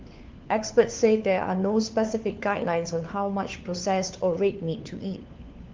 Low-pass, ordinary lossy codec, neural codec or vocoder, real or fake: 7.2 kHz; Opus, 24 kbps; codec, 16 kHz, 4 kbps, FunCodec, trained on LibriTTS, 50 frames a second; fake